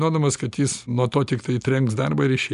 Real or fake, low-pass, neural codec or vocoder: real; 10.8 kHz; none